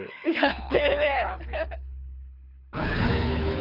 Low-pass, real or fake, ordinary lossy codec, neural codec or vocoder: 5.4 kHz; fake; none; codec, 24 kHz, 6 kbps, HILCodec